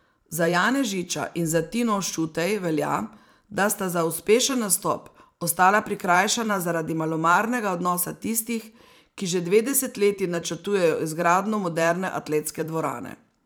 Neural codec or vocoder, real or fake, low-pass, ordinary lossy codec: vocoder, 44.1 kHz, 128 mel bands every 512 samples, BigVGAN v2; fake; none; none